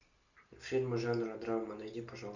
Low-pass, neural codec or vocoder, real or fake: 7.2 kHz; none; real